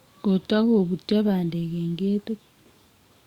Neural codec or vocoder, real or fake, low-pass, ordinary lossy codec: codec, 44.1 kHz, 7.8 kbps, DAC; fake; 19.8 kHz; Opus, 64 kbps